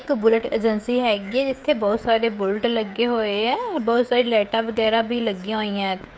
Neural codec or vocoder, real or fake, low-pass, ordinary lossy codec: codec, 16 kHz, 16 kbps, FreqCodec, smaller model; fake; none; none